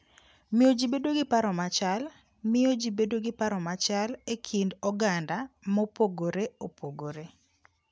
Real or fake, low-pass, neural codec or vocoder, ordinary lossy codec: real; none; none; none